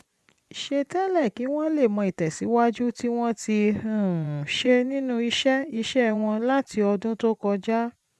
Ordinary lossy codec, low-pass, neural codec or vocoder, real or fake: none; none; vocoder, 24 kHz, 100 mel bands, Vocos; fake